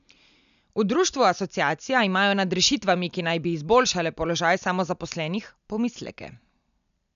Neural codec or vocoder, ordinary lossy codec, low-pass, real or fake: none; none; 7.2 kHz; real